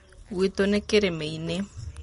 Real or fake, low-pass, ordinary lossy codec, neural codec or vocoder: real; 10.8 kHz; MP3, 48 kbps; none